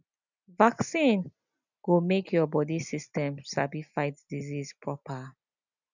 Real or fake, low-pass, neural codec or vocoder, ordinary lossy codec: real; 7.2 kHz; none; none